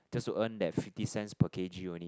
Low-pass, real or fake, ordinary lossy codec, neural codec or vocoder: none; real; none; none